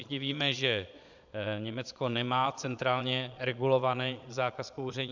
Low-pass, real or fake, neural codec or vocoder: 7.2 kHz; fake; vocoder, 22.05 kHz, 80 mel bands, Vocos